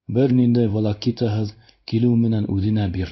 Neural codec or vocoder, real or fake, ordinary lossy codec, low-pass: codec, 16 kHz in and 24 kHz out, 1 kbps, XY-Tokenizer; fake; MP3, 48 kbps; 7.2 kHz